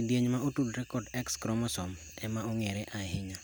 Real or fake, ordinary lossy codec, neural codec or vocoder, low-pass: real; none; none; none